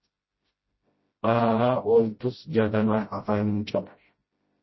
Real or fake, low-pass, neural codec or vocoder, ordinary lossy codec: fake; 7.2 kHz; codec, 16 kHz, 0.5 kbps, FreqCodec, smaller model; MP3, 24 kbps